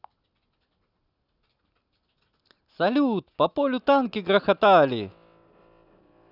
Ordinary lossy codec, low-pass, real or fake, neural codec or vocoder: none; 5.4 kHz; real; none